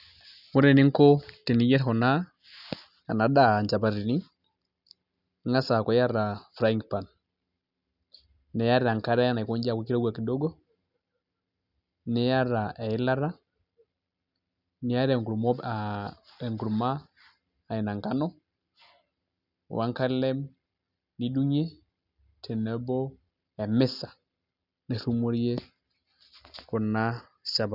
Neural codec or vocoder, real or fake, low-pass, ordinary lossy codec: none; real; 5.4 kHz; none